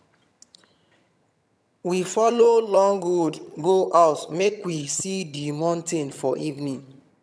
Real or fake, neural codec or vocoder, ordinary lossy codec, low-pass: fake; vocoder, 22.05 kHz, 80 mel bands, HiFi-GAN; none; none